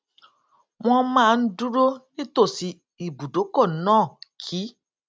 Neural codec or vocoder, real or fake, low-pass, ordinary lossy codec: none; real; none; none